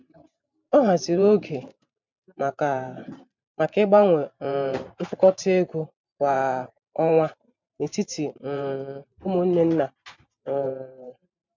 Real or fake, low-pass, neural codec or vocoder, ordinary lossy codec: fake; 7.2 kHz; vocoder, 44.1 kHz, 128 mel bands every 512 samples, BigVGAN v2; MP3, 64 kbps